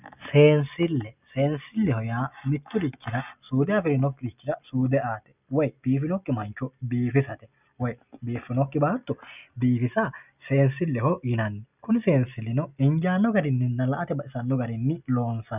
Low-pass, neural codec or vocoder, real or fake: 3.6 kHz; none; real